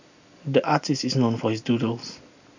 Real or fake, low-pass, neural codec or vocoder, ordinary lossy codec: real; 7.2 kHz; none; none